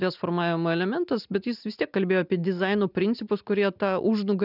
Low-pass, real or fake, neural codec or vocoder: 5.4 kHz; real; none